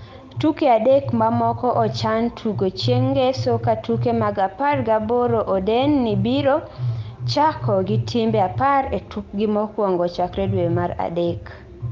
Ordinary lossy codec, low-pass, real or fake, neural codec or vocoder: Opus, 32 kbps; 7.2 kHz; real; none